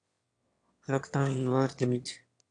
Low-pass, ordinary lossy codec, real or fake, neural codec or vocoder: 9.9 kHz; AAC, 48 kbps; fake; autoencoder, 22.05 kHz, a latent of 192 numbers a frame, VITS, trained on one speaker